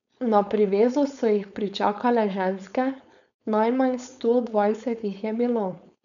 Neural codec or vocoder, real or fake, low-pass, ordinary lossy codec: codec, 16 kHz, 4.8 kbps, FACodec; fake; 7.2 kHz; none